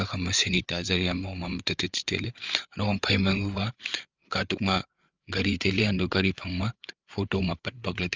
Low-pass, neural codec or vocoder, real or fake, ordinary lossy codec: 7.2 kHz; codec, 16 kHz, 8 kbps, FreqCodec, larger model; fake; Opus, 32 kbps